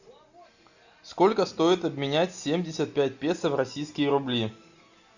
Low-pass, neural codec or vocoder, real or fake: 7.2 kHz; none; real